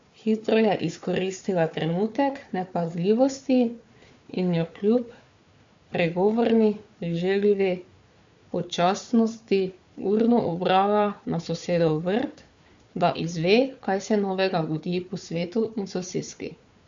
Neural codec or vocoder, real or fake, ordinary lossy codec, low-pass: codec, 16 kHz, 4 kbps, FunCodec, trained on Chinese and English, 50 frames a second; fake; MP3, 48 kbps; 7.2 kHz